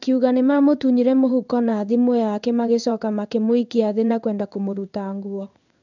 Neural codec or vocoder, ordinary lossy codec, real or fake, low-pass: codec, 16 kHz in and 24 kHz out, 1 kbps, XY-Tokenizer; none; fake; 7.2 kHz